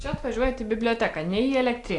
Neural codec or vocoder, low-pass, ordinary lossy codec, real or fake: none; 10.8 kHz; AAC, 48 kbps; real